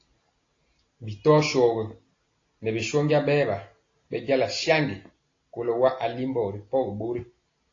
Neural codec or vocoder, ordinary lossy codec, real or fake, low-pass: none; AAC, 32 kbps; real; 7.2 kHz